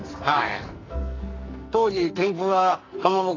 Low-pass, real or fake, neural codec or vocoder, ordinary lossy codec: 7.2 kHz; fake; codec, 44.1 kHz, 2.6 kbps, SNAC; AAC, 32 kbps